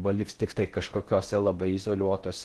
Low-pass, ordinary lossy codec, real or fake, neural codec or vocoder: 10.8 kHz; Opus, 16 kbps; fake; codec, 16 kHz in and 24 kHz out, 0.6 kbps, FocalCodec, streaming, 4096 codes